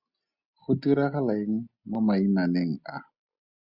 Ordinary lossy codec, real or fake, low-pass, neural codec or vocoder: Opus, 64 kbps; real; 5.4 kHz; none